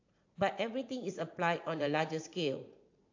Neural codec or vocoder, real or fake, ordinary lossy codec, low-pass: vocoder, 44.1 kHz, 80 mel bands, Vocos; fake; AAC, 48 kbps; 7.2 kHz